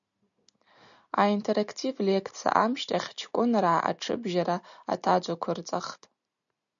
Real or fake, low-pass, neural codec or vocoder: real; 7.2 kHz; none